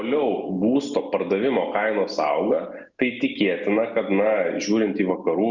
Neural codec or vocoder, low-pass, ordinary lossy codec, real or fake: none; 7.2 kHz; Opus, 64 kbps; real